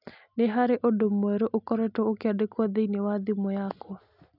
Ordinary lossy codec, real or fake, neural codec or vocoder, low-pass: none; real; none; 5.4 kHz